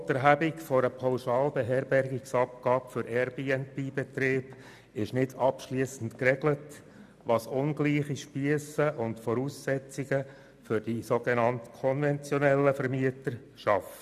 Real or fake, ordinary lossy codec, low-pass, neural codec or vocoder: real; none; 14.4 kHz; none